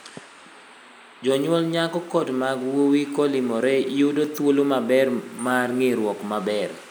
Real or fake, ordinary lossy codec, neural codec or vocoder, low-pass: real; none; none; none